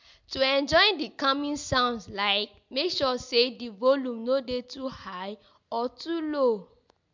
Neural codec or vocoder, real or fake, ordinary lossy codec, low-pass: none; real; MP3, 64 kbps; 7.2 kHz